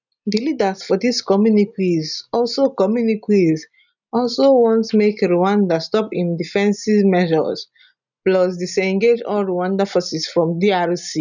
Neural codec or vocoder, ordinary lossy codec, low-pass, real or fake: none; none; 7.2 kHz; real